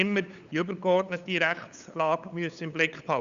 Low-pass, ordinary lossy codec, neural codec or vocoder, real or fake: 7.2 kHz; Opus, 64 kbps; codec, 16 kHz, 8 kbps, FunCodec, trained on LibriTTS, 25 frames a second; fake